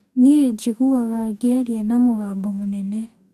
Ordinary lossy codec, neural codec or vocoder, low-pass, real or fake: none; codec, 44.1 kHz, 2.6 kbps, DAC; 14.4 kHz; fake